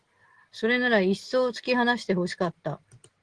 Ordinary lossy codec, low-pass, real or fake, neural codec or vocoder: Opus, 16 kbps; 9.9 kHz; real; none